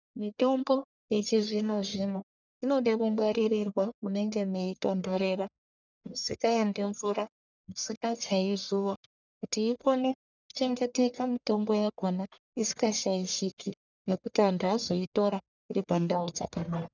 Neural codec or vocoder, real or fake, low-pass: codec, 44.1 kHz, 1.7 kbps, Pupu-Codec; fake; 7.2 kHz